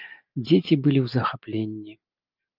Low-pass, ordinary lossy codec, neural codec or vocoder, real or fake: 5.4 kHz; Opus, 24 kbps; none; real